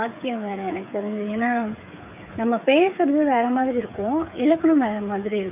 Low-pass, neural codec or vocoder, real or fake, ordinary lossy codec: 3.6 kHz; codec, 16 kHz, 8 kbps, FreqCodec, smaller model; fake; none